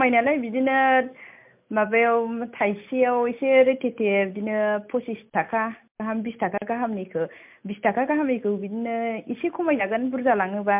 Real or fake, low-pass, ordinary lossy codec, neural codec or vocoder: real; 3.6 kHz; MP3, 32 kbps; none